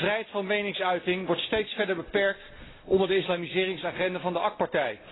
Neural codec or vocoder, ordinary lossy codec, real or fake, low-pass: none; AAC, 16 kbps; real; 7.2 kHz